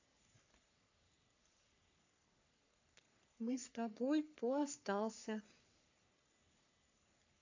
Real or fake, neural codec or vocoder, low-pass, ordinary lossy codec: fake; codec, 44.1 kHz, 3.4 kbps, Pupu-Codec; 7.2 kHz; AAC, 48 kbps